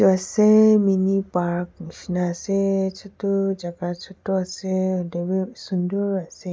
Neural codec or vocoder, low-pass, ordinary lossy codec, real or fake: none; none; none; real